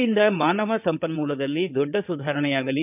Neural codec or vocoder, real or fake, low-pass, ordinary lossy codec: codec, 16 kHz in and 24 kHz out, 2.2 kbps, FireRedTTS-2 codec; fake; 3.6 kHz; none